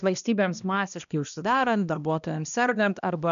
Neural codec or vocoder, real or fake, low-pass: codec, 16 kHz, 1 kbps, X-Codec, HuBERT features, trained on balanced general audio; fake; 7.2 kHz